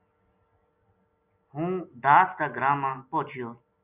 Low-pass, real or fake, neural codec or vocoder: 3.6 kHz; real; none